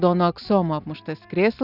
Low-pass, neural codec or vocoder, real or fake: 5.4 kHz; none; real